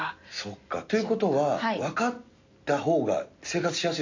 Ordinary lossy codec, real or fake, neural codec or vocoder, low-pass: AAC, 48 kbps; real; none; 7.2 kHz